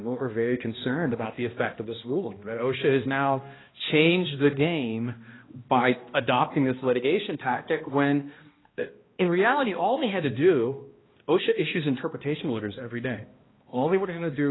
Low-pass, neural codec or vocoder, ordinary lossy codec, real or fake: 7.2 kHz; codec, 16 kHz, 1 kbps, X-Codec, HuBERT features, trained on balanced general audio; AAC, 16 kbps; fake